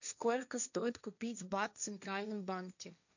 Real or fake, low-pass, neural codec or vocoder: fake; 7.2 kHz; codec, 16 kHz, 1.1 kbps, Voila-Tokenizer